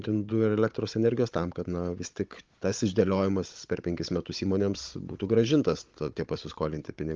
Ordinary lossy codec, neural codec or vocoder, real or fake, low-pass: Opus, 32 kbps; codec, 16 kHz, 16 kbps, FunCodec, trained on Chinese and English, 50 frames a second; fake; 7.2 kHz